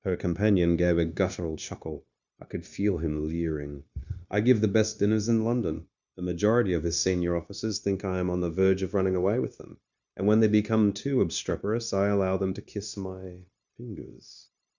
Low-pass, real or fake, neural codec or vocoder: 7.2 kHz; fake; codec, 16 kHz, 0.9 kbps, LongCat-Audio-Codec